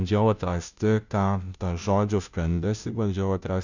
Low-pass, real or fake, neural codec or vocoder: 7.2 kHz; fake; codec, 16 kHz, 0.5 kbps, FunCodec, trained on Chinese and English, 25 frames a second